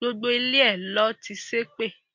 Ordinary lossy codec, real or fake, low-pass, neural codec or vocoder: MP3, 48 kbps; real; 7.2 kHz; none